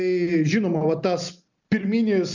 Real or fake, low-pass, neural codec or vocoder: real; 7.2 kHz; none